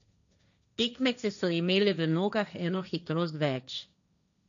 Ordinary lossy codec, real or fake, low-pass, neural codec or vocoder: none; fake; 7.2 kHz; codec, 16 kHz, 1.1 kbps, Voila-Tokenizer